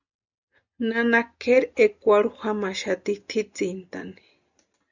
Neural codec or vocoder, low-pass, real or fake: none; 7.2 kHz; real